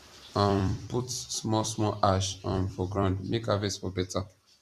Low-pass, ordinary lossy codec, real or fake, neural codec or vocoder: 14.4 kHz; Opus, 64 kbps; fake; vocoder, 44.1 kHz, 128 mel bands every 256 samples, BigVGAN v2